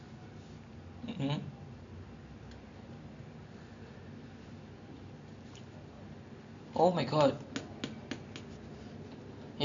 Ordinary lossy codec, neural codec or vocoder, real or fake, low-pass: AAC, 64 kbps; none; real; 7.2 kHz